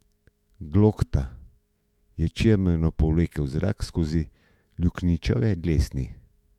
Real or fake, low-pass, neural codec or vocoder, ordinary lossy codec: fake; 19.8 kHz; autoencoder, 48 kHz, 128 numbers a frame, DAC-VAE, trained on Japanese speech; MP3, 96 kbps